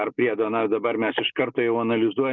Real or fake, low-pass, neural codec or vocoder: real; 7.2 kHz; none